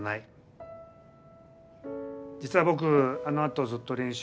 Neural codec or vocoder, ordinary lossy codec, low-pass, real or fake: none; none; none; real